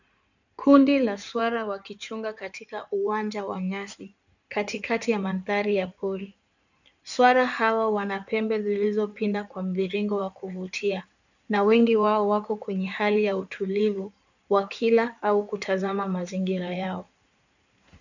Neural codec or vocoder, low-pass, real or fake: codec, 16 kHz in and 24 kHz out, 2.2 kbps, FireRedTTS-2 codec; 7.2 kHz; fake